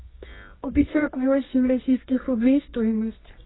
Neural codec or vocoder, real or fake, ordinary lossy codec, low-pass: codec, 24 kHz, 0.9 kbps, WavTokenizer, medium music audio release; fake; AAC, 16 kbps; 7.2 kHz